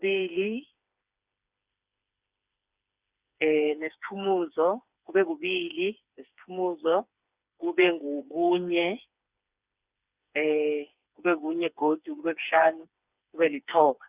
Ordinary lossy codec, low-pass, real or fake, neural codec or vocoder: Opus, 64 kbps; 3.6 kHz; fake; codec, 16 kHz, 4 kbps, FreqCodec, smaller model